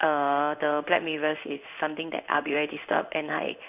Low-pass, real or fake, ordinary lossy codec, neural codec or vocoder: 3.6 kHz; fake; none; codec, 16 kHz in and 24 kHz out, 1 kbps, XY-Tokenizer